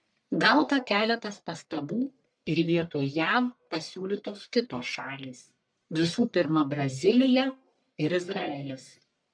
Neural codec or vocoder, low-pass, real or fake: codec, 44.1 kHz, 1.7 kbps, Pupu-Codec; 9.9 kHz; fake